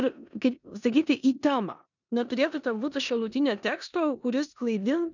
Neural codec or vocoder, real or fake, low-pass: codec, 16 kHz in and 24 kHz out, 0.9 kbps, LongCat-Audio-Codec, four codebook decoder; fake; 7.2 kHz